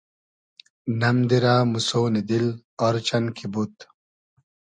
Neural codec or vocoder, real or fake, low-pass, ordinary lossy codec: none; real; 9.9 kHz; MP3, 96 kbps